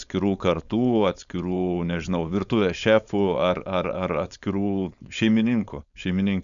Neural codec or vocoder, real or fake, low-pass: codec, 16 kHz, 4.8 kbps, FACodec; fake; 7.2 kHz